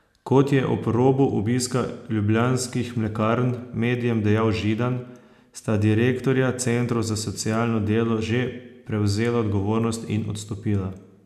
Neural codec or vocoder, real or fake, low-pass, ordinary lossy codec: none; real; 14.4 kHz; none